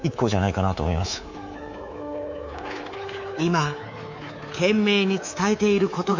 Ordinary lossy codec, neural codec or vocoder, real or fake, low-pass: none; codec, 24 kHz, 3.1 kbps, DualCodec; fake; 7.2 kHz